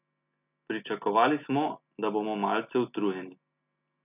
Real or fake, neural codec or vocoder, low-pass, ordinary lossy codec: real; none; 3.6 kHz; none